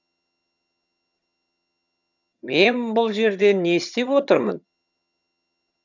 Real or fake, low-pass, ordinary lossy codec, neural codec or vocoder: fake; 7.2 kHz; none; vocoder, 22.05 kHz, 80 mel bands, HiFi-GAN